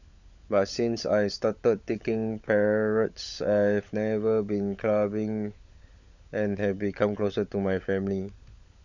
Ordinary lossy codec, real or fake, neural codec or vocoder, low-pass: none; fake; codec, 16 kHz, 16 kbps, FunCodec, trained on LibriTTS, 50 frames a second; 7.2 kHz